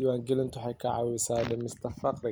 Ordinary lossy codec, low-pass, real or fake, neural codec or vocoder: none; none; real; none